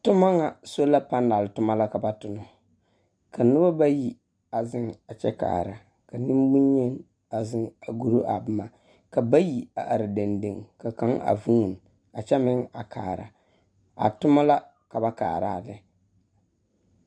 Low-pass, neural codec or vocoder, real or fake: 9.9 kHz; none; real